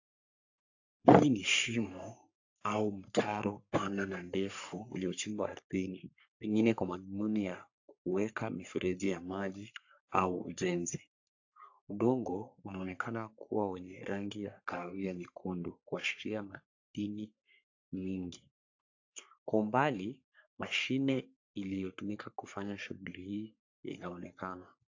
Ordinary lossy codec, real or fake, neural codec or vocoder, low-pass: AAC, 48 kbps; fake; codec, 44.1 kHz, 3.4 kbps, Pupu-Codec; 7.2 kHz